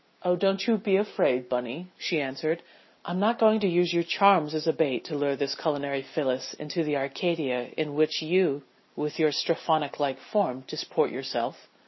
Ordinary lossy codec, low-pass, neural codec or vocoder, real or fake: MP3, 24 kbps; 7.2 kHz; none; real